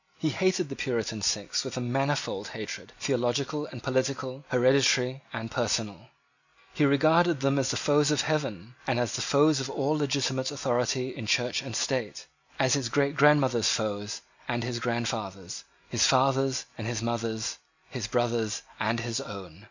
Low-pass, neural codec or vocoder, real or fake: 7.2 kHz; none; real